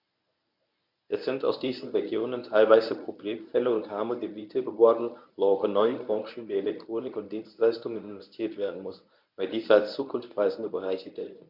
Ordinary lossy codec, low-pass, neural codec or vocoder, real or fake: none; 5.4 kHz; codec, 24 kHz, 0.9 kbps, WavTokenizer, medium speech release version 1; fake